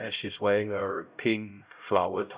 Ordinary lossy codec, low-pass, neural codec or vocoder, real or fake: Opus, 64 kbps; 3.6 kHz; codec, 16 kHz, 0.5 kbps, X-Codec, HuBERT features, trained on LibriSpeech; fake